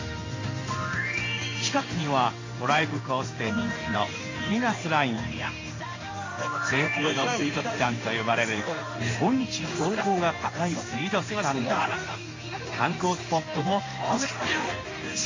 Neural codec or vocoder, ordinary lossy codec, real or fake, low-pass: codec, 16 kHz in and 24 kHz out, 1 kbps, XY-Tokenizer; AAC, 32 kbps; fake; 7.2 kHz